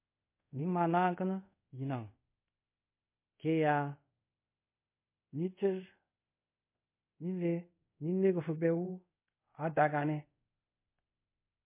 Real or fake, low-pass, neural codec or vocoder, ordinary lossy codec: fake; 3.6 kHz; codec, 24 kHz, 0.5 kbps, DualCodec; MP3, 24 kbps